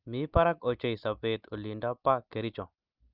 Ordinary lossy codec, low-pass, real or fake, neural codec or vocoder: Opus, 24 kbps; 5.4 kHz; real; none